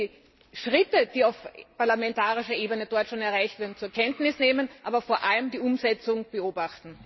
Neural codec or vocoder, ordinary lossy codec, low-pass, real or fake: none; MP3, 24 kbps; 7.2 kHz; real